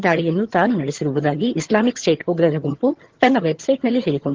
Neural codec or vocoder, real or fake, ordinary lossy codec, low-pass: vocoder, 22.05 kHz, 80 mel bands, HiFi-GAN; fake; Opus, 16 kbps; 7.2 kHz